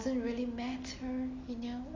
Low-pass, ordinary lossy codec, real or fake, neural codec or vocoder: 7.2 kHz; AAC, 32 kbps; real; none